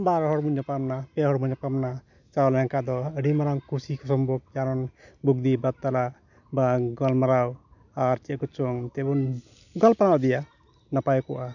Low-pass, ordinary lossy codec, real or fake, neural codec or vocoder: 7.2 kHz; none; real; none